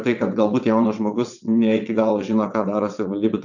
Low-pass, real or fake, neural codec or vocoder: 7.2 kHz; fake; vocoder, 22.05 kHz, 80 mel bands, WaveNeXt